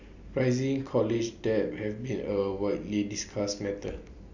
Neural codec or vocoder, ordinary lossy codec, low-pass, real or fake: none; none; 7.2 kHz; real